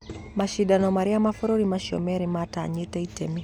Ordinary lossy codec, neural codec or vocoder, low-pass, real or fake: none; none; 19.8 kHz; real